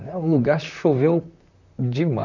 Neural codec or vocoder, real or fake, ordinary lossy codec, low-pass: vocoder, 44.1 kHz, 128 mel bands, Pupu-Vocoder; fake; none; 7.2 kHz